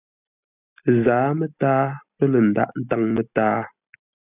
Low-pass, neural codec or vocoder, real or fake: 3.6 kHz; none; real